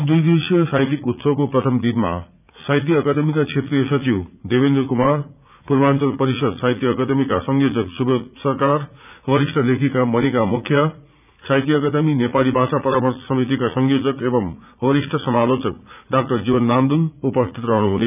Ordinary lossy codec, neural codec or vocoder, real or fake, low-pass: none; vocoder, 44.1 kHz, 80 mel bands, Vocos; fake; 3.6 kHz